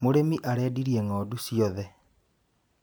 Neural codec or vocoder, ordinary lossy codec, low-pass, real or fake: none; none; none; real